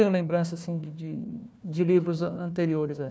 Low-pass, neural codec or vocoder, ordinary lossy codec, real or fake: none; codec, 16 kHz, 1 kbps, FunCodec, trained on Chinese and English, 50 frames a second; none; fake